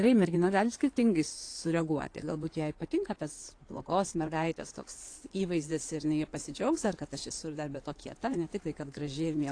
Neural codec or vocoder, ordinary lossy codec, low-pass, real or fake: codec, 16 kHz in and 24 kHz out, 2.2 kbps, FireRedTTS-2 codec; AAC, 48 kbps; 9.9 kHz; fake